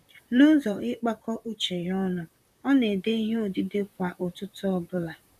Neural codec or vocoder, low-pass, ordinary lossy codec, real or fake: vocoder, 44.1 kHz, 128 mel bands, Pupu-Vocoder; 14.4 kHz; none; fake